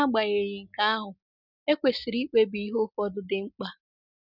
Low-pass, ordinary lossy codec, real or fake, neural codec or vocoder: 5.4 kHz; none; real; none